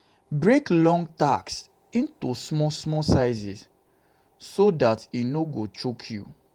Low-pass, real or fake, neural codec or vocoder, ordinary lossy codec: 19.8 kHz; fake; vocoder, 48 kHz, 128 mel bands, Vocos; Opus, 32 kbps